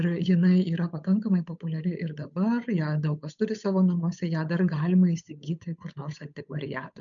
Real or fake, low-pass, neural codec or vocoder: fake; 7.2 kHz; codec, 16 kHz, 8 kbps, FunCodec, trained on Chinese and English, 25 frames a second